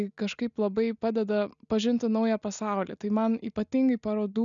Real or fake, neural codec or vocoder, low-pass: real; none; 7.2 kHz